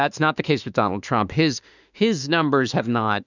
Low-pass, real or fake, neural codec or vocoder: 7.2 kHz; fake; autoencoder, 48 kHz, 32 numbers a frame, DAC-VAE, trained on Japanese speech